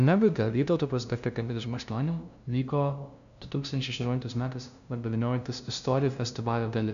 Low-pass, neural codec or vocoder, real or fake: 7.2 kHz; codec, 16 kHz, 0.5 kbps, FunCodec, trained on LibriTTS, 25 frames a second; fake